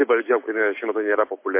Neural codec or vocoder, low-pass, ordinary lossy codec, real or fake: none; 3.6 kHz; MP3, 24 kbps; real